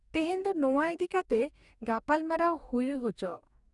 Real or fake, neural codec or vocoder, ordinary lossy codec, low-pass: fake; codec, 44.1 kHz, 2.6 kbps, DAC; none; 10.8 kHz